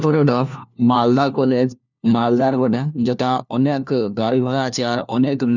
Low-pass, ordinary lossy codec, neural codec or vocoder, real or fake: 7.2 kHz; none; codec, 16 kHz, 1 kbps, FunCodec, trained on LibriTTS, 50 frames a second; fake